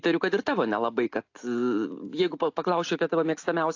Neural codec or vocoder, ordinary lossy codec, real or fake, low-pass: none; AAC, 48 kbps; real; 7.2 kHz